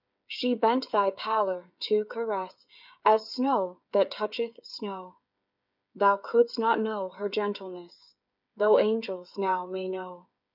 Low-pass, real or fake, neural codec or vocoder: 5.4 kHz; fake; codec, 16 kHz, 8 kbps, FreqCodec, smaller model